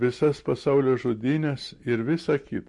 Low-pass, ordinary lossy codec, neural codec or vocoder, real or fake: 10.8 kHz; MP3, 48 kbps; none; real